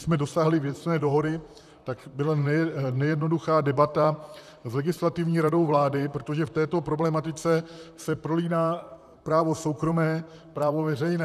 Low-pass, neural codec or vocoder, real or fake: 14.4 kHz; vocoder, 44.1 kHz, 128 mel bands, Pupu-Vocoder; fake